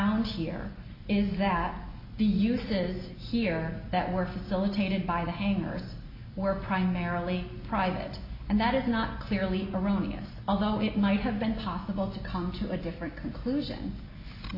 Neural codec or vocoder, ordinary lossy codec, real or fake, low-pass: none; AAC, 48 kbps; real; 5.4 kHz